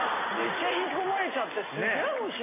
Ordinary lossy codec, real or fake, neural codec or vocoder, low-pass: MP3, 16 kbps; real; none; 3.6 kHz